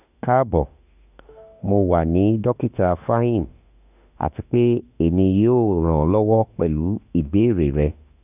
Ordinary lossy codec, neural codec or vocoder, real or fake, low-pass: none; autoencoder, 48 kHz, 32 numbers a frame, DAC-VAE, trained on Japanese speech; fake; 3.6 kHz